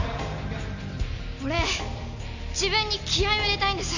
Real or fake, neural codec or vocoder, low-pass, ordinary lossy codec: real; none; 7.2 kHz; none